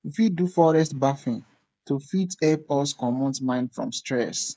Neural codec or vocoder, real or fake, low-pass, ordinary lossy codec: codec, 16 kHz, 8 kbps, FreqCodec, smaller model; fake; none; none